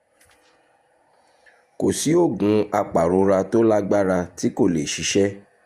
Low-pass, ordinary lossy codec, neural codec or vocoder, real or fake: 14.4 kHz; Opus, 64 kbps; vocoder, 48 kHz, 128 mel bands, Vocos; fake